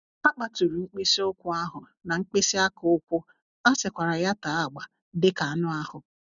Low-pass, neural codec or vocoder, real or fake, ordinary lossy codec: 7.2 kHz; none; real; none